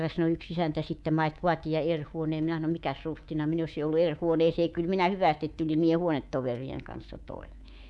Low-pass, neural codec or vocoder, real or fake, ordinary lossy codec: none; codec, 24 kHz, 3.1 kbps, DualCodec; fake; none